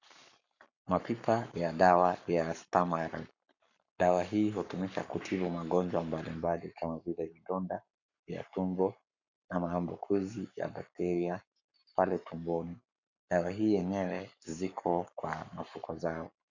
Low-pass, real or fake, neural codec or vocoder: 7.2 kHz; fake; codec, 44.1 kHz, 7.8 kbps, Pupu-Codec